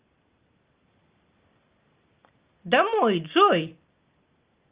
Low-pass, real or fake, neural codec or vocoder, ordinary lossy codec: 3.6 kHz; real; none; Opus, 32 kbps